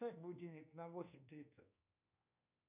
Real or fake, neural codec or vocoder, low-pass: fake; codec, 24 kHz, 1.2 kbps, DualCodec; 3.6 kHz